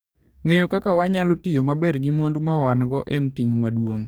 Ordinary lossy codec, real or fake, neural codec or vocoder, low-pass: none; fake; codec, 44.1 kHz, 2.6 kbps, DAC; none